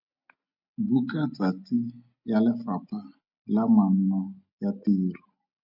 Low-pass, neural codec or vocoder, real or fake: 5.4 kHz; none; real